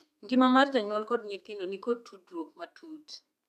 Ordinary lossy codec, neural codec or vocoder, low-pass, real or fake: none; codec, 32 kHz, 1.9 kbps, SNAC; 14.4 kHz; fake